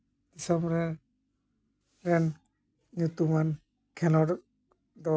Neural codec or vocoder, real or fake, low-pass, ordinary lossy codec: none; real; none; none